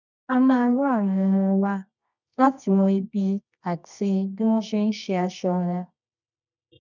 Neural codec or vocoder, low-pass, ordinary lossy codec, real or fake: codec, 24 kHz, 0.9 kbps, WavTokenizer, medium music audio release; 7.2 kHz; none; fake